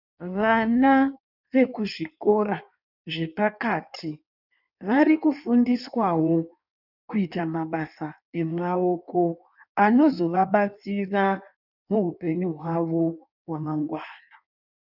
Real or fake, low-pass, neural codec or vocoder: fake; 5.4 kHz; codec, 16 kHz in and 24 kHz out, 1.1 kbps, FireRedTTS-2 codec